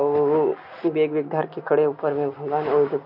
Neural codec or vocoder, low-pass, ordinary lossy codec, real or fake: none; 5.4 kHz; MP3, 48 kbps; real